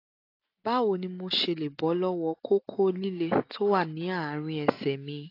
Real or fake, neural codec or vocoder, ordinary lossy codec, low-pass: real; none; AAC, 32 kbps; 5.4 kHz